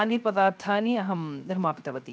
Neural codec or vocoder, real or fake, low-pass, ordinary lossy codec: codec, 16 kHz, 0.7 kbps, FocalCodec; fake; none; none